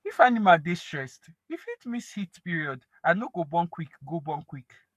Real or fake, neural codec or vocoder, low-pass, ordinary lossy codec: fake; codec, 44.1 kHz, 7.8 kbps, Pupu-Codec; 14.4 kHz; none